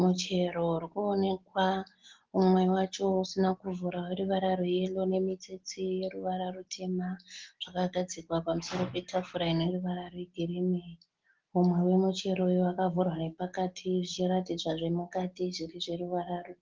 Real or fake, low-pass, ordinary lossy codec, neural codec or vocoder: real; 7.2 kHz; Opus, 16 kbps; none